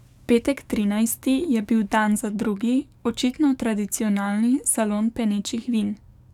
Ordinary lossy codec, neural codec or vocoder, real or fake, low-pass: none; codec, 44.1 kHz, 7.8 kbps, DAC; fake; 19.8 kHz